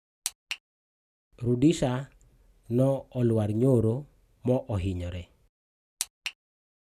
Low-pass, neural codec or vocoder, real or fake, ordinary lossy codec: 14.4 kHz; none; real; none